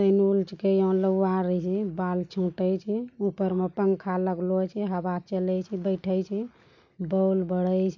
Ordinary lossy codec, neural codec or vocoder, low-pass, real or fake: none; none; 7.2 kHz; real